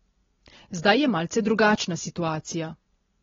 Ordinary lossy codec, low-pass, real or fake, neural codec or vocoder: AAC, 24 kbps; 7.2 kHz; real; none